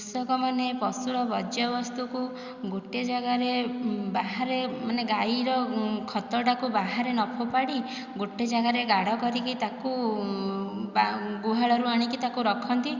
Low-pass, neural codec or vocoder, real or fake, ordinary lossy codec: 7.2 kHz; none; real; Opus, 64 kbps